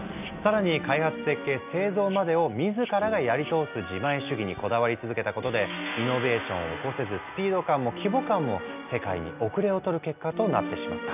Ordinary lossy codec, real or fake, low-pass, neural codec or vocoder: none; real; 3.6 kHz; none